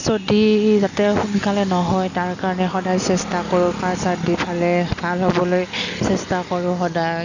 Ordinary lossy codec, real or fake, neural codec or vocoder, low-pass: none; real; none; 7.2 kHz